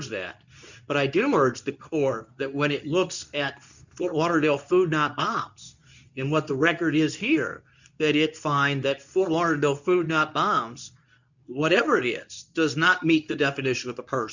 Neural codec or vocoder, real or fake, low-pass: codec, 24 kHz, 0.9 kbps, WavTokenizer, medium speech release version 2; fake; 7.2 kHz